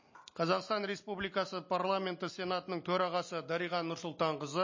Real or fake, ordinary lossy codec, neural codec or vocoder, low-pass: real; MP3, 32 kbps; none; 7.2 kHz